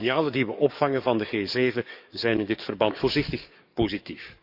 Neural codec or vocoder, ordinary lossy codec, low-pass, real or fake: codec, 44.1 kHz, 7.8 kbps, DAC; Opus, 64 kbps; 5.4 kHz; fake